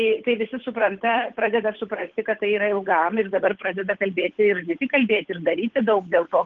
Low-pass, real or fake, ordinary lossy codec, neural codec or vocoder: 7.2 kHz; fake; Opus, 16 kbps; codec, 16 kHz, 8 kbps, FunCodec, trained on Chinese and English, 25 frames a second